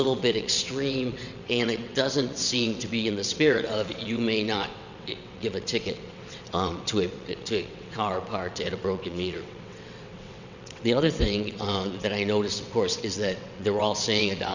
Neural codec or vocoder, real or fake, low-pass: vocoder, 22.05 kHz, 80 mel bands, WaveNeXt; fake; 7.2 kHz